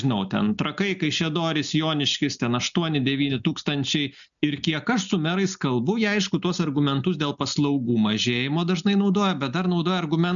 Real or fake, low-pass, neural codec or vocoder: real; 7.2 kHz; none